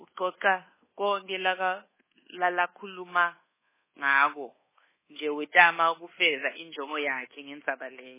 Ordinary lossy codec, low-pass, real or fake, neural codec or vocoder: MP3, 16 kbps; 3.6 kHz; fake; codec, 24 kHz, 1.2 kbps, DualCodec